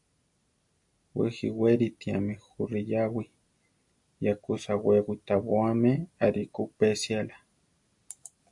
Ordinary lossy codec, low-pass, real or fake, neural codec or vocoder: AAC, 64 kbps; 10.8 kHz; real; none